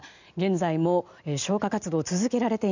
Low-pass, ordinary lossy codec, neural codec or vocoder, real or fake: 7.2 kHz; none; none; real